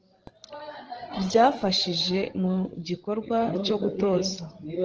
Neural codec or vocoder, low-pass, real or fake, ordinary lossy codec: codec, 16 kHz, 16 kbps, FreqCodec, larger model; 7.2 kHz; fake; Opus, 16 kbps